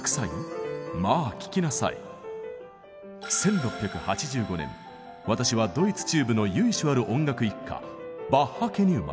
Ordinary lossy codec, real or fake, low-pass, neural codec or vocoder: none; real; none; none